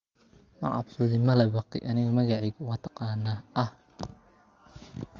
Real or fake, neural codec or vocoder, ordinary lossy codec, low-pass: real; none; Opus, 32 kbps; 7.2 kHz